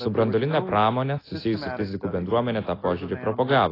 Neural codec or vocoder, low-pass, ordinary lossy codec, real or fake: none; 5.4 kHz; AAC, 24 kbps; real